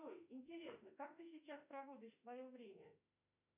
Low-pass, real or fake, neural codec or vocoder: 3.6 kHz; fake; autoencoder, 48 kHz, 32 numbers a frame, DAC-VAE, trained on Japanese speech